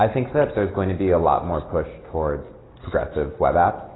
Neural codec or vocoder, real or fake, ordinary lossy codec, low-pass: none; real; AAC, 16 kbps; 7.2 kHz